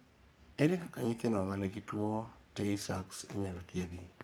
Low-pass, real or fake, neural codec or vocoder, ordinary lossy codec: none; fake; codec, 44.1 kHz, 3.4 kbps, Pupu-Codec; none